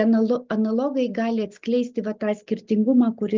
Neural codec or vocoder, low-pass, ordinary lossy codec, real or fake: none; 7.2 kHz; Opus, 32 kbps; real